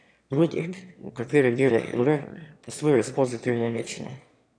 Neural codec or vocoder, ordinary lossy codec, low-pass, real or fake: autoencoder, 22.05 kHz, a latent of 192 numbers a frame, VITS, trained on one speaker; none; 9.9 kHz; fake